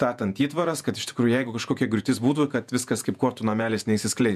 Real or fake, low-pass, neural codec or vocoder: fake; 14.4 kHz; vocoder, 48 kHz, 128 mel bands, Vocos